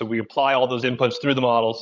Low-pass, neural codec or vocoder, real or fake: 7.2 kHz; codec, 16 kHz, 16 kbps, FreqCodec, larger model; fake